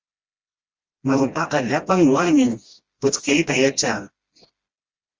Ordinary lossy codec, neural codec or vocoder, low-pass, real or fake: Opus, 32 kbps; codec, 16 kHz, 1 kbps, FreqCodec, smaller model; 7.2 kHz; fake